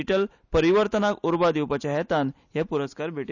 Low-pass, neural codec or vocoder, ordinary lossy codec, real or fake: 7.2 kHz; none; none; real